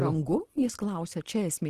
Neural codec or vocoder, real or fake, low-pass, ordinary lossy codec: none; real; 14.4 kHz; Opus, 16 kbps